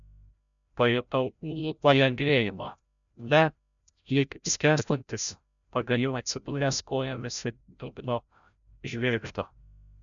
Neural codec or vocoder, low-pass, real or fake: codec, 16 kHz, 0.5 kbps, FreqCodec, larger model; 7.2 kHz; fake